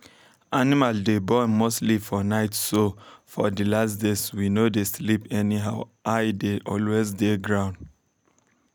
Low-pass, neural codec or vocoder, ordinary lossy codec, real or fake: none; none; none; real